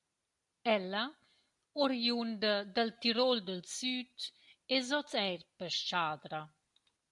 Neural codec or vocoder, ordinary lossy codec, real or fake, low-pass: none; MP3, 64 kbps; real; 10.8 kHz